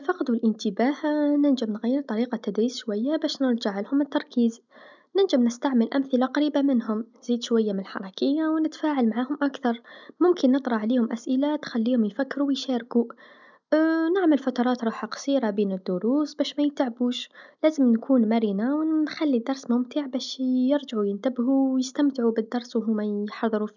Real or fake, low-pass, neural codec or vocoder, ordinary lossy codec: real; 7.2 kHz; none; none